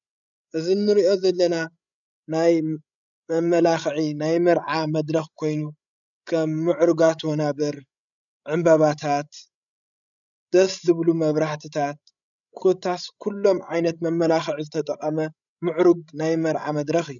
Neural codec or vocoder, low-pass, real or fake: codec, 16 kHz, 16 kbps, FreqCodec, larger model; 7.2 kHz; fake